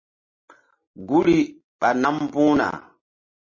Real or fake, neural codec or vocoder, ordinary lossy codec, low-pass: real; none; MP3, 32 kbps; 7.2 kHz